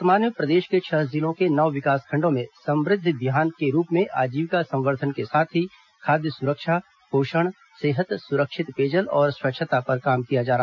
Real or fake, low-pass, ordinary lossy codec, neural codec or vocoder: real; 7.2 kHz; none; none